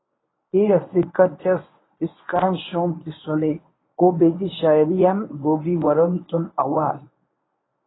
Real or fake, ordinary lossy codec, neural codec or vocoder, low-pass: fake; AAC, 16 kbps; codec, 24 kHz, 0.9 kbps, WavTokenizer, medium speech release version 1; 7.2 kHz